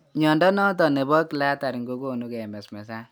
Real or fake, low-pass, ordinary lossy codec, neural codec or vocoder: real; none; none; none